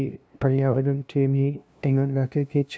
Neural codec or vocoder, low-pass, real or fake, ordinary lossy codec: codec, 16 kHz, 0.5 kbps, FunCodec, trained on LibriTTS, 25 frames a second; none; fake; none